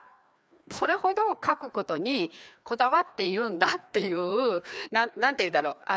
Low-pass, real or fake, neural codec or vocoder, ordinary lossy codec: none; fake; codec, 16 kHz, 2 kbps, FreqCodec, larger model; none